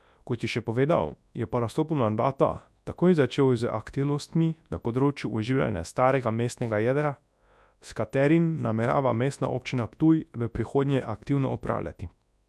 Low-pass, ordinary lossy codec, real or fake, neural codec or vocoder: none; none; fake; codec, 24 kHz, 0.9 kbps, WavTokenizer, large speech release